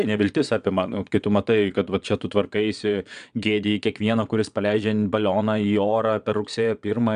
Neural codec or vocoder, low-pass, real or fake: vocoder, 22.05 kHz, 80 mel bands, Vocos; 9.9 kHz; fake